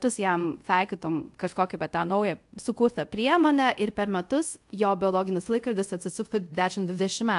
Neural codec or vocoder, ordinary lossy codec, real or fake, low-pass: codec, 24 kHz, 0.5 kbps, DualCodec; AAC, 64 kbps; fake; 10.8 kHz